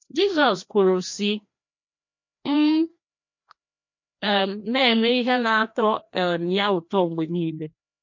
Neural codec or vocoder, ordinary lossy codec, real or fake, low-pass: codec, 16 kHz, 1 kbps, FreqCodec, larger model; MP3, 48 kbps; fake; 7.2 kHz